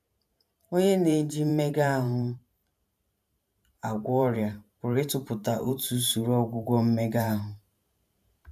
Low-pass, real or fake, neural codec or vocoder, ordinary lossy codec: 14.4 kHz; fake; vocoder, 44.1 kHz, 128 mel bands every 512 samples, BigVGAN v2; none